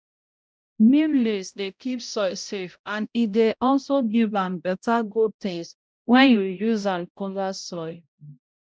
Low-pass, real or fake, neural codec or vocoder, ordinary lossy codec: none; fake; codec, 16 kHz, 0.5 kbps, X-Codec, HuBERT features, trained on balanced general audio; none